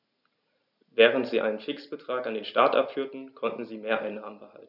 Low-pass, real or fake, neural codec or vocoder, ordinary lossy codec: 5.4 kHz; real; none; none